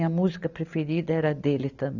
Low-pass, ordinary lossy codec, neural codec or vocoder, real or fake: 7.2 kHz; none; none; real